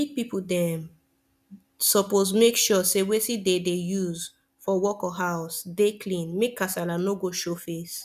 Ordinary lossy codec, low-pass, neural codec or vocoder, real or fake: none; 14.4 kHz; none; real